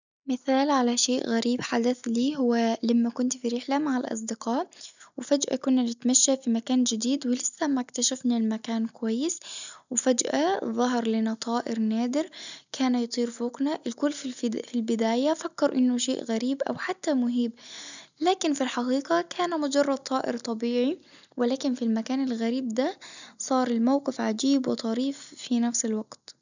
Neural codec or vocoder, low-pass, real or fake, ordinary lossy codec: none; 7.2 kHz; real; none